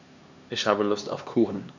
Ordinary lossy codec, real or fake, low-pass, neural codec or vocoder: none; fake; 7.2 kHz; codec, 16 kHz, 2 kbps, X-Codec, HuBERT features, trained on LibriSpeech